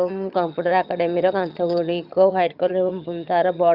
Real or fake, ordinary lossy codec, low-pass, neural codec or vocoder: fake; Opus, 64 kbps; 5.4 kHz; vocoder, 22.05 kHz, 80 mel bands, HiFi-GAN